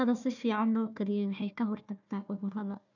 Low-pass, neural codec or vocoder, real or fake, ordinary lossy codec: 7.2 kHz; codec, 16 kHz, 1 kbps, FunCodec, trained on Chinese and English, 50 frames a second; fake; none